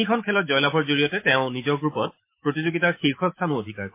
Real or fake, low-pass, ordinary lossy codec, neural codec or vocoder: fake; 3.6 kHz; MP3, 24 kbps; autoencoder, 48 kHz, 128 numbers a frame, DAC-VAE, trained on Japanese speech